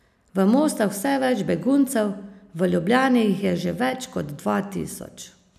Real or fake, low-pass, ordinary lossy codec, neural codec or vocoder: real; 14.4 kHz; none; none